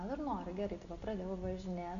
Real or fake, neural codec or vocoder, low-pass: real; none; 7.2 kHz